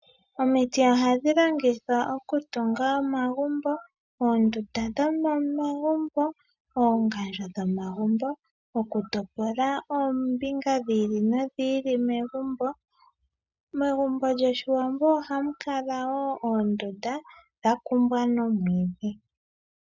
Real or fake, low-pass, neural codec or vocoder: real; 7.2 kHz; none